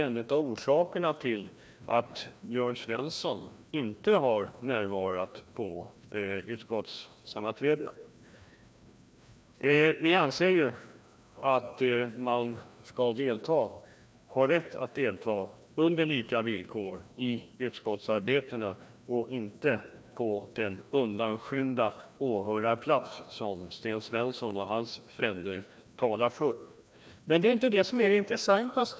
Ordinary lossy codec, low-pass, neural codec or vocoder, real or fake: none; none; codec, 16 kHz, 1 kbps, FreqCodec, larger model; fake